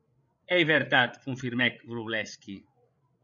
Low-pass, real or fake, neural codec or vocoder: 7.2 kHz; fake; codec, 16 kHz, 8 kbps, FreqCodec, larger model